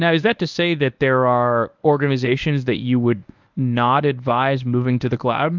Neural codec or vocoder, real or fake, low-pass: codec, 24 kHz, 0.9 kbps, WavTokenizer, medium speech release version 1; fake; 7.2 kHz